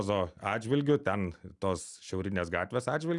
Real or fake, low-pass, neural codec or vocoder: real; 10.8 kHz; none